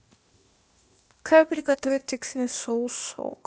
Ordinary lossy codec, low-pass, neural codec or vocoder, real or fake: none; none; codec, 16 kHz, 0.8 kbps, ZipCodec; fake